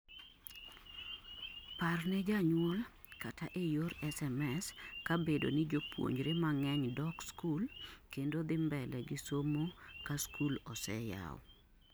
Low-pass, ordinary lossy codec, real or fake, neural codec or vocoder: none; none; real; none